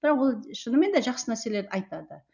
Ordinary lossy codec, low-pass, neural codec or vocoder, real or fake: none; 7.2 kHz; none; real